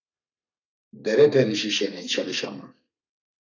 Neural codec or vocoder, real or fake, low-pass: codec, 32 kHz, 1.9 kbps, SNAC; fake; 7.2 kHz